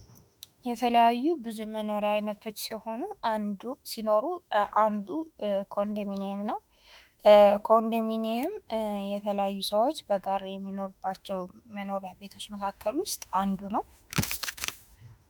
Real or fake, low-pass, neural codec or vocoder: fake; 19.8 kHz; autoencoder, 48 kHz, 32 numbers a frame, DAC-VAE, trained on Japanese speech